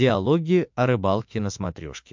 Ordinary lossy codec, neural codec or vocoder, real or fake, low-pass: MP3, 64 kbps; autoencoder, 48 kHz, 32 numbers a frame, DAC-VAE, trained on Japanese speech; fake; 7.2 kHz